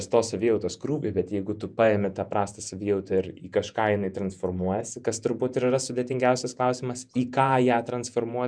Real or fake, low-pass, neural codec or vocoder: fake; 9.9 kHz; vocoder, 48 kHz, 128 mel bands, Vocos